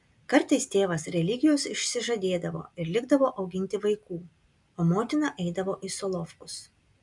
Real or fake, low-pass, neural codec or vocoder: real; 10.8 kHz; none